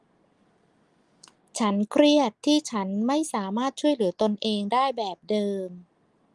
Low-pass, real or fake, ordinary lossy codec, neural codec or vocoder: 10.8 kHz; real; Opus, 24 kbps; none